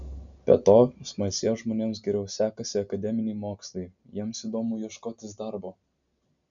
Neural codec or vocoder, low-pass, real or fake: none; 7.2 kHz; real